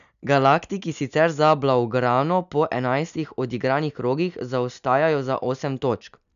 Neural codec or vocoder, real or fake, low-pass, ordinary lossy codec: none; real; 7.2 kHz; none